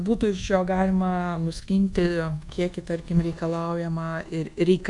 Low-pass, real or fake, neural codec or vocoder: 10.8 kHz; fake; codec, 24 kHz, 1.2 kbps, DualCodec